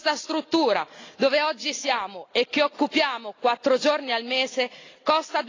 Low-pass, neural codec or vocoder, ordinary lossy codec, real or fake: 7.2 kHz; none; AAC, 32 kbps; real